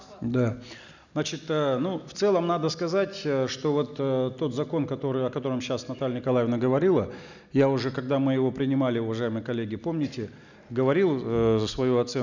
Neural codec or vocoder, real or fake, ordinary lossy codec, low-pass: none; real; none; 7.2 kHz